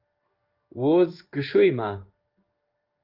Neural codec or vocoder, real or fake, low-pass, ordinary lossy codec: codec, 16 kHz in and 24 kHz out, 1 kbps, XY-Tokenizer; fake; 5.4 kHz; Opus, 32 kbps